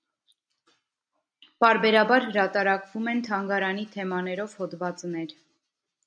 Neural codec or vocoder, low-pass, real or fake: none; 9.9 kHz; real